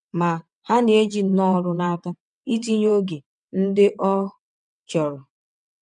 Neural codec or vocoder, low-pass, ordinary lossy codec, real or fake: vocoder, 22.05 kHz, 80 mel bands, WaveNeXt; 9.9 kHz; none; fake